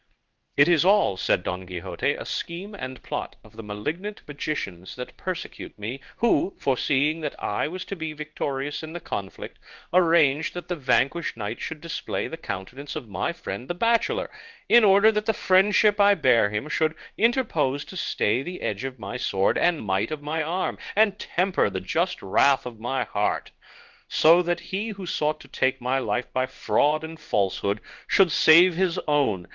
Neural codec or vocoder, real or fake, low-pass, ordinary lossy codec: codec, 16 kHz in and 24 kHz out, 1 kbps, XY-Tokenizer; fake; 7.2 kHz; Opus, 32 kbps